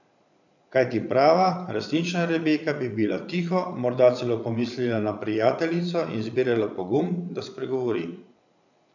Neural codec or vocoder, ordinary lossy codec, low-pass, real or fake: vocoder, 22.05 kHz, 80 mel bands, Vocos; none; 7.2 kHz; fake